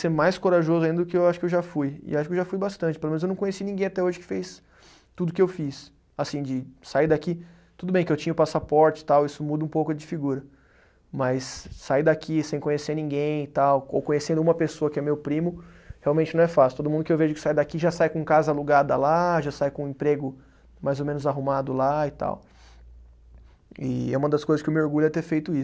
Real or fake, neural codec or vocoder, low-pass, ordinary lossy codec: real; none; none; none